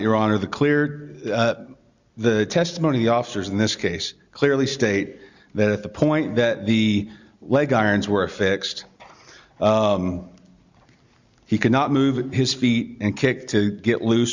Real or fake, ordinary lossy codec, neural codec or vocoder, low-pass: real; Opus, 64 kbps; none; 7.2 kHz